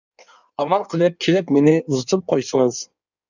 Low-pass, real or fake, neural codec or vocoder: 7.2 kHz; fake; codec, 16 kHz in and 24 kHz out, 1.1 kbps, FireRedTTS-2 codec